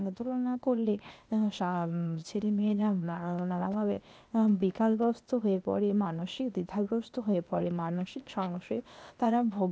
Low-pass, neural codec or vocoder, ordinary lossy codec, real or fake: none; codec, 16 kHz, 0.8 kbps, ZipCodec; none; fake